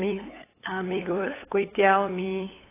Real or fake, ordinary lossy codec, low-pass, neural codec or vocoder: fake; AAC, 16 kbps; 3.6 kHz; codec, 16 kHz, 8 kbps, FunCodec, trained on LibriTTS, 25 frames a second